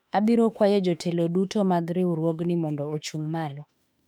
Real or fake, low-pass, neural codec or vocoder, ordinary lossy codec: fake; 19.8 kHz; autoencoder, 48 kHz, 32 numbers a frame, DAC-VAE, trained on Japanese speech; none